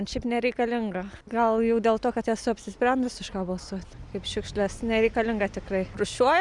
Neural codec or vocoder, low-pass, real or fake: none; 10.8 kHz; real